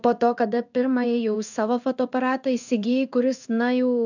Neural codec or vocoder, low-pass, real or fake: codec, 16 kHz in and 24 kHz out, 1 kbps, XY-Tokenizer; 7.2 kHz; fake